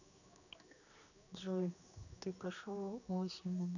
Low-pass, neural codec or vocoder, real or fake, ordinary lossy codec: 7.2 kHz; codec, 16 kHz, 2 kbps, X-Codec, HuBERT features, trained on general audio; fake; none